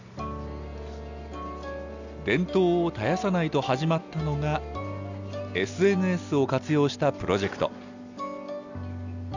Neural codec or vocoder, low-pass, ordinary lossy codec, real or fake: none; 7.2 kHz; none; real